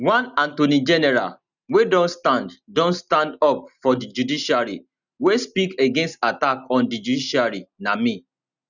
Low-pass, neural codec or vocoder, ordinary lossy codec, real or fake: 7.2 kHz; none; none; real